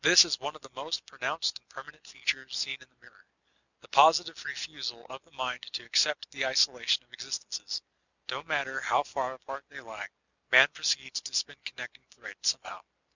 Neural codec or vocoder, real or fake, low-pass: none; real; 7.2 kHz